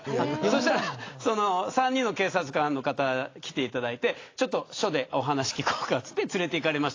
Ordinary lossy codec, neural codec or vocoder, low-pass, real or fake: AAC, 32 kbps; none; 7.2 kHz; real